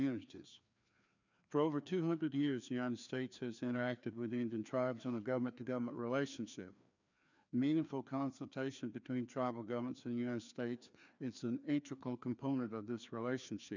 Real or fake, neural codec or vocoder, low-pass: fake; codec, 16 kHz, 2 kbps, FreqCodec, larger model; 7.2 kHz